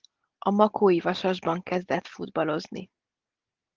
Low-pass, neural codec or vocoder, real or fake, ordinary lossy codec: 7.2 kHz; none; real; Opus, 16 kbps